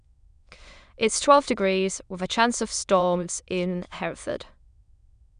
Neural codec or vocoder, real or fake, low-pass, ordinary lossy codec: autoencoder, 22.05 kHz, a latent of 192 numbers a frame, VITS, trained on many speakers; fake; 9.9 kHz; none